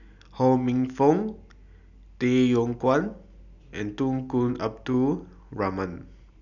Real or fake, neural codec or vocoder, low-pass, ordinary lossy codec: real; none; 7.2 kHz; none